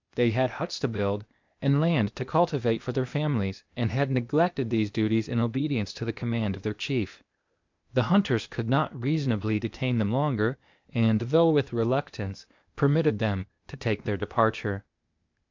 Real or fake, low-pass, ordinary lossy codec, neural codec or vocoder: fake; 7.2 kHz; MP3, 64 kbps; codec, 16 kHz, 0.8 kbps, ZipCodec